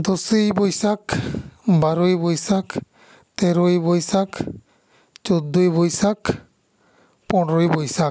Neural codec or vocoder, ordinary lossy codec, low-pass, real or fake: none; none; none; real